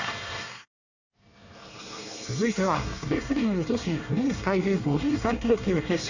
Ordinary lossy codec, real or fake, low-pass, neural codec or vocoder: none; fake; 7.2 kHz; codec, 24 kHz, 1 kbps, SNAC